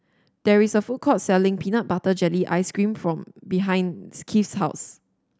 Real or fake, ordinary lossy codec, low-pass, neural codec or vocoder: real; none; none; none